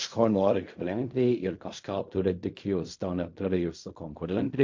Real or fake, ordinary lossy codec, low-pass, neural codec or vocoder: fake; MP3, 48 kbps; 7.2 kHz; codec, 16 kHz in and 24 kHz out, 0.4 kbps, LongCat-Audio-Codec, fine tuned four codebook decoder